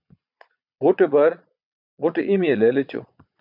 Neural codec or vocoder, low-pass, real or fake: vocoder, 22.05 kHz, 80 mel bands, Vocos; 5.4 kHz; fake